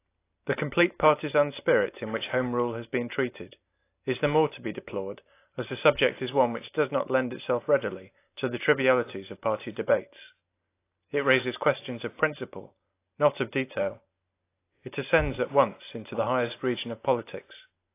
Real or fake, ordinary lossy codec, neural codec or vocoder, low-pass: real; AAC, 24 kbps; none; 3.6 kHz